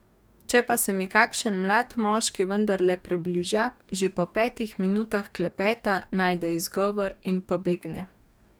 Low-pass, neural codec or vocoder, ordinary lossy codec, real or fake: none; codec, 44.1 kHz, 2.6 kbps, DAC; none; fake